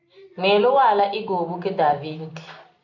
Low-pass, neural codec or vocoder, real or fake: 7.2 kHz; none; real